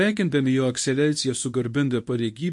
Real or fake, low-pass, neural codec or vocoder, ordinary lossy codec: fake; 10.8 kHz; codec, 24 kHz, 0.9 kbps, WavTokenizer, medium speech release version 1; MP3, 48 kbps